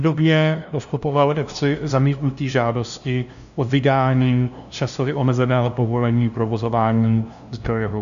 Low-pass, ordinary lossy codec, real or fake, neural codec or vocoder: 7.2 kHz; AAC, 96 kbps; fake; codec, 16 kHz, 0.5 kbps, FunCodec, trained on LibriTTS, 25 frames a second